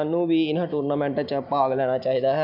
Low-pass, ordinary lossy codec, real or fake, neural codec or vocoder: 5.4 kHz; none; real; none